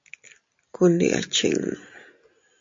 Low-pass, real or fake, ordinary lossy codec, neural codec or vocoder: 7.2 kHz; real; MP3, 48 kbps; none